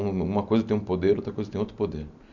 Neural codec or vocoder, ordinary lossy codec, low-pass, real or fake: none; none; 7.2 kHz; real